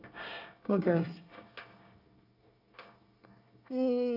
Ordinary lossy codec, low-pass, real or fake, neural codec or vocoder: none; 5.4 kHz; fake; codec, 24 kHz, 1 kbps, SNAC